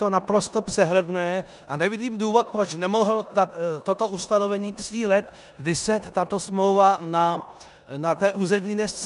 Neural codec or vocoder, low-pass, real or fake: codec, 16 kHz in and 24 kHz out, 0.9 kbps, LongCat-Audio-Codec, four codebook decoder; 10.8 kHz; fake